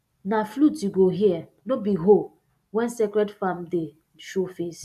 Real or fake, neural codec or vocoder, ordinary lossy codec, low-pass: real; none; AAC, 96 kbps; 14.4 kHz